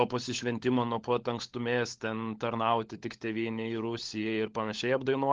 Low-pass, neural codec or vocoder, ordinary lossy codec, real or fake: 7.2 kHz; codec, 16 kHz, 16 kbps, FunCodec, trained on LibriTTS, 50 frames a second; Opus, 16 kbps; fake